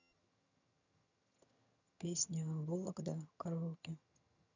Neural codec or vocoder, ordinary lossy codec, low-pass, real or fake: vocoder, 22.05 kHz, 80 mel bands, HiFi-GAN; none; 7.2 kHz; fake